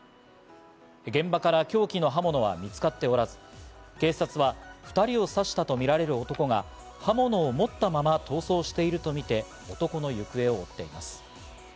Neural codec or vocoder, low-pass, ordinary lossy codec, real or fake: none; none; none; real